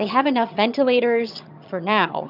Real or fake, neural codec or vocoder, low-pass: fake; vocoder, 22.05 kHz, 80 mel bands, HiFi-GAN; 5.4 kHz